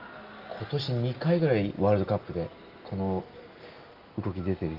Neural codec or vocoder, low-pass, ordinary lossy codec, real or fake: none; 5.4 kHz; Opus, 32 kbps; real